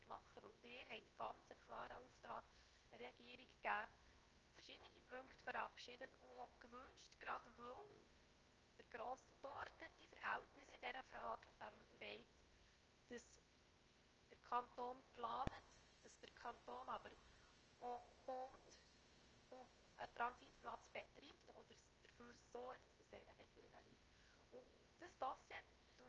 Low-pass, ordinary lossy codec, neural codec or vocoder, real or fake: 7.2 kHz; Opus, 24 kbps; codec, 16 kHz, 0.7 kbps, FocalCodec; fake